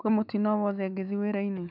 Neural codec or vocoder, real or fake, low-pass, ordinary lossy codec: autoencoder, 48 kHz, 128 numbers a frame, DAC-VAE, trained on Japanese speech; fake; 5.4 kHz; none